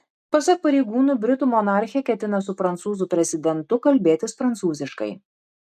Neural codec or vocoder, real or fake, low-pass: none; real; 14.4 kHz